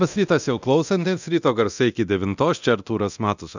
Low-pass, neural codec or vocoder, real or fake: 7.2 kHz; codec, 24 kHz, 0.9 kbps, DualCodec; fake